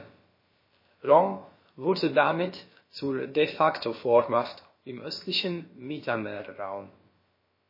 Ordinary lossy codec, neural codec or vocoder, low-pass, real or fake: MP3, 24 kbps; codec, 16 kHz, about 1 kbps, DyCAST, with the encoder's durations; 5.4 kHz; fake